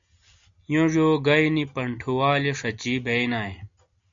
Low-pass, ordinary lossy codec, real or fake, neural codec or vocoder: 7.2 kHz; AAC, 64 kbps; real; none